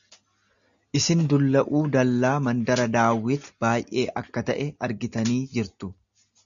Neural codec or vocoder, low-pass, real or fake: none; 7.2 kHz; real